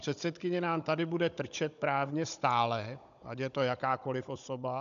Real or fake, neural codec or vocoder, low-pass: fake; codec, 16 kHz, 16 kbps, FunCodec, trained on Chinese and English, 50 frames a second; 7.2 kHz